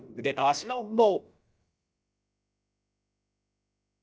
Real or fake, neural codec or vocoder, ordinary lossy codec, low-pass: fake; codec, 16 kHz, about 1 kbps, DyCAST, with the encoder's durations; none; none